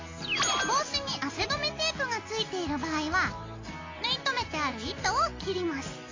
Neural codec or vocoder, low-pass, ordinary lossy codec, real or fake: none; 7.2 kHz; AAC, 32 kbps; real